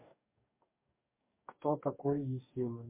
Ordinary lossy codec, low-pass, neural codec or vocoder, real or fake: MP3, 24 kbps; 3.6 kHz; codec, 44.1 kHz, 2.6 kbps, DAC; fake